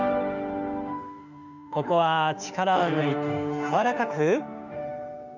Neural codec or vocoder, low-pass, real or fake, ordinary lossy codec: autoencoder, 48 kHz, 32 numbers a frame, DAC-VAE, trained on Japanese speech; 7.2 kHz; fake; none